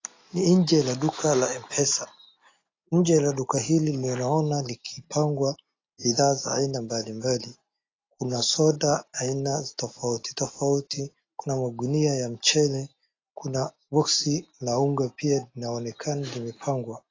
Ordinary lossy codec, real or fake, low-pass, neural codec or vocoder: AAC, 32 kbps; real; 7.2 kHz; none